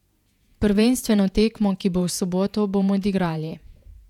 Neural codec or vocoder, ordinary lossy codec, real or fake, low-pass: vocoder, 48 kHz, 128 mel bands, Vocos; none; fake; 19.8 kHz